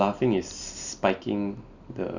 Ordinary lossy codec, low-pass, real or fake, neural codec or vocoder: none; 7.2 kHz; real; none